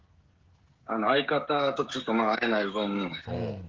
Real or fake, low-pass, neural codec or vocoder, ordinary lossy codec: fake; 7.2 kHz; codec, 16 kHz, 16 kbps, FreqCodec, smaller model; Opus, 16 kbps